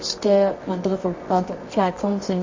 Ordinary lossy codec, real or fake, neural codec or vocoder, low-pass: MP3, 32 kbps; fake; codec, 24 kHz, 0.9 kbps, WavTokenizer, medium music audio release; 7.2 kHz